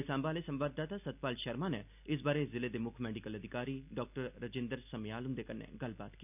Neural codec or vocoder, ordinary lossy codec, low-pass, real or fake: none; none; 3.6 kHz; real